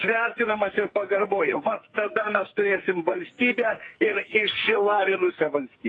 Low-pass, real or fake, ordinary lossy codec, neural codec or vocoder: 9.9 kHz; fake; AAC, 32 kbps; codec, 44.1 kHz, 2.6 kbps, SNAC